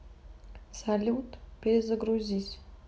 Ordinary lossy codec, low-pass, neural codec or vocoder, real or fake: none; none; none; real